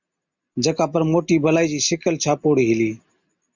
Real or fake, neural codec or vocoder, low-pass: real; none; 7.2 kHz